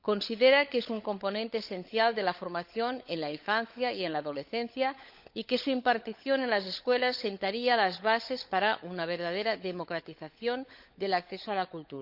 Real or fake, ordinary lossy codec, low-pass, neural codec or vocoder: fake; Opus, 64 kbps; 5.4 kHz; codec, 16 kHz, 16 kbps, FunCodec, trained on LibriTTS, 50 frames a second